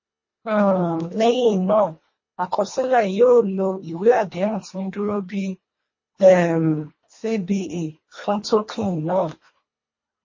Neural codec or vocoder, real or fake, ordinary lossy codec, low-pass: codec, 24 kHz, 1.5 kbps, HILCodec; fake; MP3, 32 kbps; 7.2 kHz